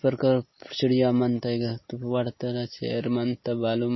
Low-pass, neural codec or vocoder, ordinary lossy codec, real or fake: 7.2 kHz; none; MP3, 24 kbps; real